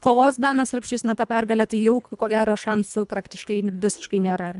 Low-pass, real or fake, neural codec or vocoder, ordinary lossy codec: 10.8 kHz; fake; codec, 24 kHz, 1.5 kbps, HILCodec; MP3, 96 kbps